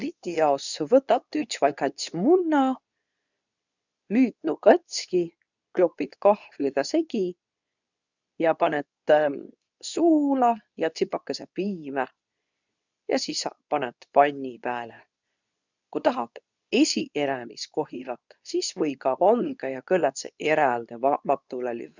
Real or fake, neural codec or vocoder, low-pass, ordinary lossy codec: fake; codec, 24 kHz, 0.9 kbps, WavTokenizer, medium speech release version 2; 7.2 kHz; none